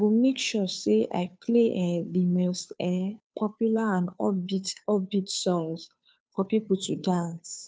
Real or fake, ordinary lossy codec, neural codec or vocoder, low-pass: fake; none; codec, 16 kHz, 2 kbps, FunCodec, trained on Chinese and English, 25 frames a second; none